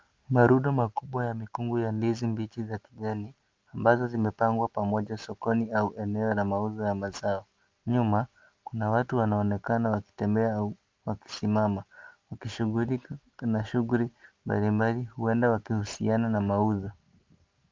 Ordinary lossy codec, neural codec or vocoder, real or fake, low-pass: Opus, 24 kbps; none; real; 7.2 kHz